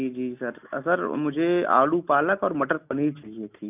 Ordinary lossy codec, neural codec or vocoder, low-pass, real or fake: none; none; 3.6 kHz; real